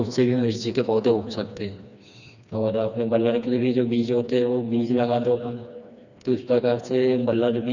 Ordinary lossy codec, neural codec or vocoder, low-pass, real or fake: none; codec, 16 kHz, 2 kbps, FreqCodec, smaller model; 7.2 kHz; fake